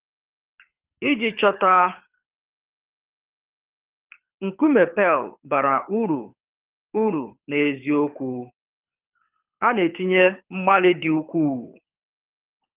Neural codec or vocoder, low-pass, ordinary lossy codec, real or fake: codec, 24 kHz, 6 kbps, HILCodec; 3.6 kHz; Opus, 24 kbps; fake